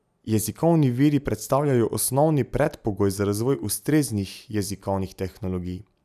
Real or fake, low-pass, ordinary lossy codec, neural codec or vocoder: real; 14.4 kHz; none; none